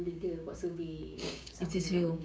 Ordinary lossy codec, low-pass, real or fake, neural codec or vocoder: none; none; fake; codec, 16 kHz, 6 kbps, DAC